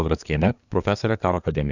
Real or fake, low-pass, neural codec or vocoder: fake; 7.2 kHz; codec, 24 kHz, 1 kbps, SNAC